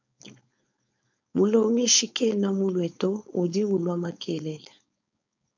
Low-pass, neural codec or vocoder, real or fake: 7.2 kHz; codec, 16 kHz, 4.8 kbps, FACodec; fake